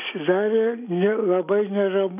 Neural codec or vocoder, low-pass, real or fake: none; 3.6 kHz; real